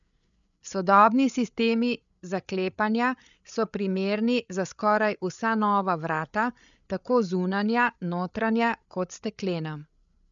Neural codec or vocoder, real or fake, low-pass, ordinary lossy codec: codec, 16 kHz, 8 kbps, FreqCodec, larger model; fake; 7.2 kHz; none